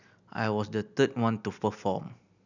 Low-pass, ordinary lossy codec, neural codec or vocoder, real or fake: 7.2 kHz; none; none; real